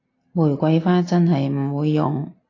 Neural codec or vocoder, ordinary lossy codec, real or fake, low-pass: none; AAC, 32 kbps; real; 7.2 kHz